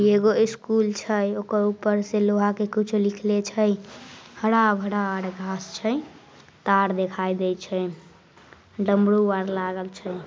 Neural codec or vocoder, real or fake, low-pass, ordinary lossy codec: none; real; none; none